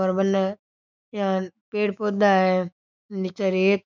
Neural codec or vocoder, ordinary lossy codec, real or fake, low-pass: autoencoder, 48 kHz, 128 numbers a frame, DAC-VAE, trained on Japanese speech; none; fake; 7.2 kHz